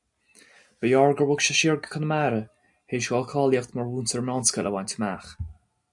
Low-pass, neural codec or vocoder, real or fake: 10.8 kHz; none; real